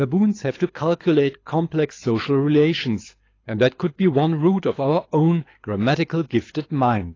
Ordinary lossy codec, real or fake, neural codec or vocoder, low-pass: AAC, 32 kbps; fake; codec, 24 kHz, 6 kbps, HILCodec; 7.2 kHz